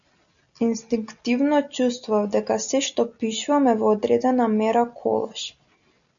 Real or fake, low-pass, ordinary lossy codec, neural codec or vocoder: real; 7.2 kHz; MP3, 96 kbps; none